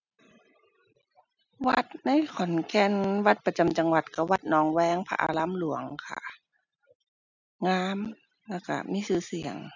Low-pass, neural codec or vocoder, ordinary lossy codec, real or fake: 7.2 kHz; none; none; real